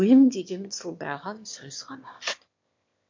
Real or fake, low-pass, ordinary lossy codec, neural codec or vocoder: fake; 7.2 kHz; MP3, 48 kbps; autoencoder, 22.05 kHz, a latent of 192 numbers a frame, VITS, trained on one speaker